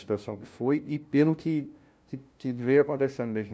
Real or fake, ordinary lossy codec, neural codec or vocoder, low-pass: fake; none; codec, 16 kHz, 0.5 kbps, FunCodec, trained on LibriTTS, 25 frames a second; none